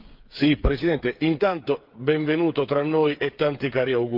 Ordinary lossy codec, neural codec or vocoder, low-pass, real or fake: Opus, 32 kbps; codec, 16 kHz, 8 kbps, FreqCodec, smaller model; 5.4 kHz; fake